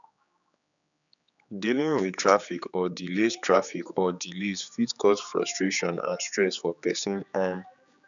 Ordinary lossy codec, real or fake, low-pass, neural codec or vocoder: none; fake; 7.2 kHz; codec, 16 kHz, 4 kbps, X-Codec, HuBERT features, trained on general audio